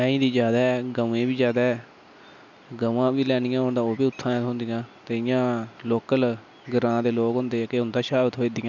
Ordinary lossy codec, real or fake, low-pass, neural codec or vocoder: none; real; 7.2 kHz; none